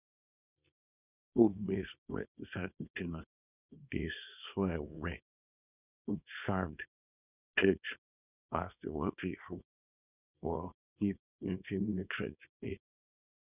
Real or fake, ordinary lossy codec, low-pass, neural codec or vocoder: fake; none; 3.6 kHz; codec, 24 kHz, 0.9 kbps, WavTokenizer, small release